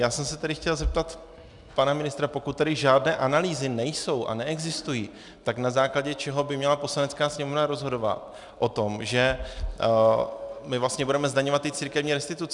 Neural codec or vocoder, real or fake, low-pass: none; real; 10.8 kHz